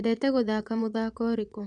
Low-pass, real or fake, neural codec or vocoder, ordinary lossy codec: 9.9 kHz; fake; vocoder, 22.05 kHz, 80 mel bands, Vocos; none